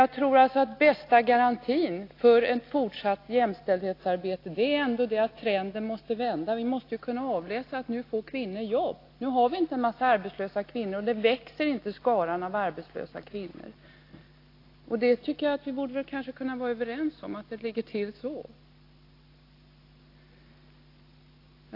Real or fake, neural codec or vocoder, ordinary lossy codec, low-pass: real; none; AAC, 32 kbps; 5.4 kHz